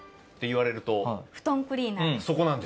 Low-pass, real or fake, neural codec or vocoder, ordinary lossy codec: none; real; none; none